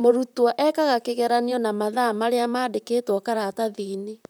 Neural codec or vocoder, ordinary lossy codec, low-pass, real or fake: vocoder, 44.1 kHz, 128 mel bands, Pupu-Vocoder; none; none; fake